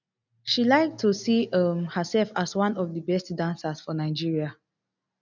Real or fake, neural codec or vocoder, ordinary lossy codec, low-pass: real; none; none; 7.2 kHz